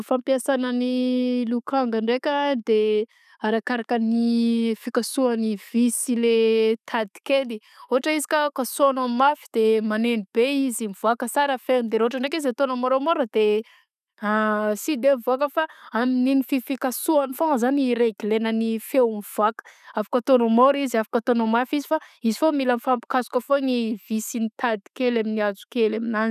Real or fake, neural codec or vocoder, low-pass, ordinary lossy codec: real; none; 14.4 kHz; none